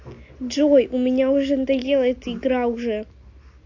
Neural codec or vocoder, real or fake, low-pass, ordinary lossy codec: none; real; 7.2 kHz; AAC, 48 kbps